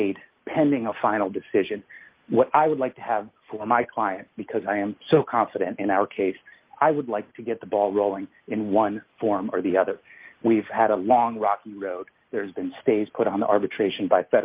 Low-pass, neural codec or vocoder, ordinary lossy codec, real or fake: 3.6 kHz; none; Opus, 24 kbps; real